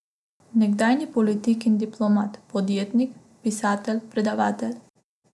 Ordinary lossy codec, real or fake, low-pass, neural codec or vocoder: none; real; none; none